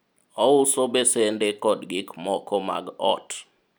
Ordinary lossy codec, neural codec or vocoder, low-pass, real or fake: none; none; none; real